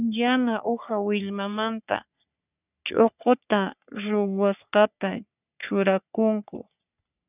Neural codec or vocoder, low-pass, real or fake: codec, 44.1 kHz, 3.4 kbps, Pupu-Codec; 3.6 kHz; fake